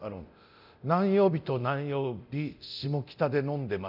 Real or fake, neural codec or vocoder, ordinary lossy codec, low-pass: fake; codec, 24 kHz, 0.9 kbps, DualCodec; none; 5.4 kHz